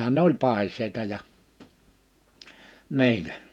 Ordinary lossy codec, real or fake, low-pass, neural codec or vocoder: none; fake; 19.8 kHz; vocoder, 48 kHz, 128 mel bands, Vocos